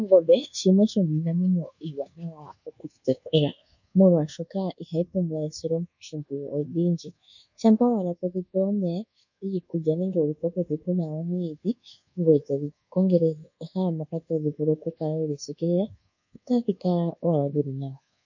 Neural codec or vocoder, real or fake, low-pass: codec, 24 kHz, 1.2 kbps, DualCodec; fake; 7.2 kHz